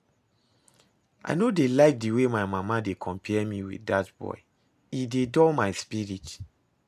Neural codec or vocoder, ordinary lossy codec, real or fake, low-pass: none; none; real; 14.4 kHz